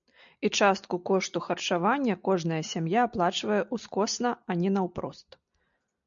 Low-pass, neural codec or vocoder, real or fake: 7.2 kHz; none; real